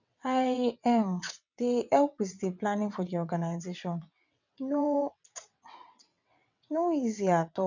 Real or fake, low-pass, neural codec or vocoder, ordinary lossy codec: fake; 7.2 kHz; vocoder, 22.05 kHz, 80 mel bands, WaveNeXt; none